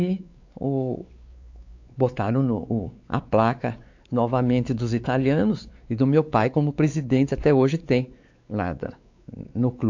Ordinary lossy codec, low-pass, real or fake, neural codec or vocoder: AAC, 48 kbps; 7.2 kHz; fake; codec, 16 kHz, 4 kbps, X-Codec, WavLM features, trained on Multilingual LibriSpeech